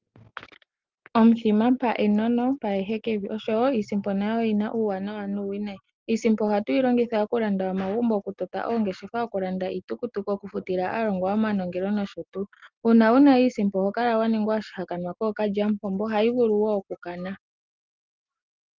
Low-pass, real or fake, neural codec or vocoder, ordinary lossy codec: 7.2 kHz; real; none; Opus, 32 kbps